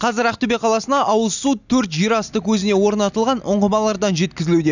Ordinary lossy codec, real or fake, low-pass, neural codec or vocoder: none; real; 7.2 kHz; none